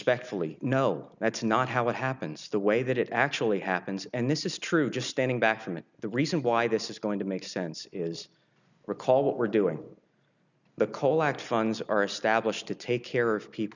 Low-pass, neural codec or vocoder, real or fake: 7.2 kHz; none; real